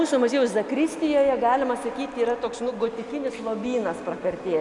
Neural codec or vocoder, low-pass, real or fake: none; 10.8 kHz; real